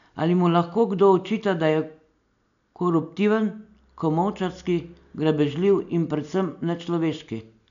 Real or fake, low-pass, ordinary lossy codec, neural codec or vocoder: real; 7.2 kHz; none; none